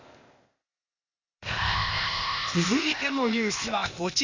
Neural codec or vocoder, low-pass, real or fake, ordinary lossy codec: codec, 16 kHz, 0.8 kbps, ZipCodec; 7.2 kHz; fake; Opus, 64 kbps